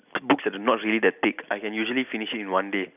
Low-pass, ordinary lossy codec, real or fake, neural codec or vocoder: 3.6 kHz; none; real; none